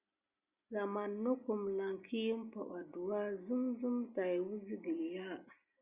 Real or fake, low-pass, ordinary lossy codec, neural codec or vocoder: real; 3.6 kHz; Opus, 64 kbps; none